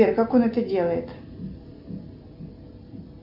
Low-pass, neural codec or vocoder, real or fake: 5.4 kHz; none; real